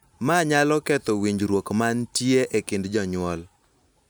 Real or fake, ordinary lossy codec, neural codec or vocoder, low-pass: real; none; none; none